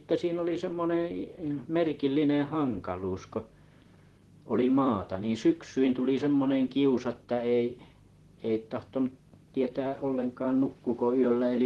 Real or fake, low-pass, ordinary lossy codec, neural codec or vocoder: fake; 19.8 kHz; Opus, 16 kbps; vocoder, 44.1 kHz, 128 mel bands, Pupu-Vocoder